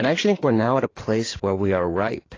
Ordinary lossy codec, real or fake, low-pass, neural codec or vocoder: AAC, 32 kbps; fake; 7.2 kHz; codec, 16 kHz in and 24 kHz out, 2.2 kbps, FireRedTTS-2 codec